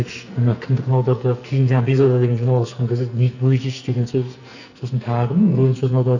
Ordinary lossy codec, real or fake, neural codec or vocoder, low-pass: none; fake; codec, 44.1 kHz, 2.6 kbps, SNAC; 7.2 kHz